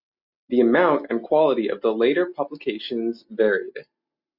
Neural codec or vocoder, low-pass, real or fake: none; 5.4 kHz; real